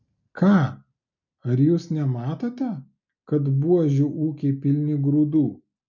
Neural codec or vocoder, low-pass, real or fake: none; 7.2 kHz; real